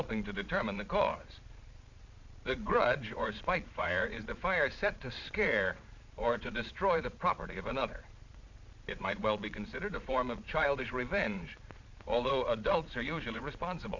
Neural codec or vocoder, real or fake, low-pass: vocoder, 22.05 kHz, 80 mel bands, Vocos; fake; 7.2 kHz